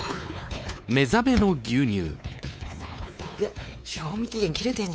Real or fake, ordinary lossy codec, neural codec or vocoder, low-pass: fake; none; codec, 16 kHz, 4 kbps, X-Codec, WavLM features, trained on Multilingual LibriSpeech; none